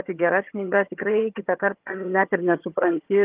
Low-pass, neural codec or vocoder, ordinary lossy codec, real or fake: 3.6 kHz; vocoder, 22.05 kHz, 80 mel bands, HiFi-GAN; Opus, 32 kbps; fake